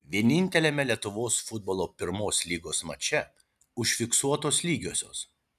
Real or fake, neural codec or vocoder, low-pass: real; none; 14.4 kHz